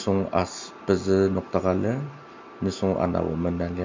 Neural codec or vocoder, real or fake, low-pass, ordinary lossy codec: none; real; 7.2 kHz; MP3, 48 kbps